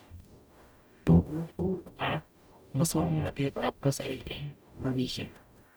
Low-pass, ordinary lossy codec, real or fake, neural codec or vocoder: none; none; fake; codec, 44.1 kHz, 0.9 kbps, DAC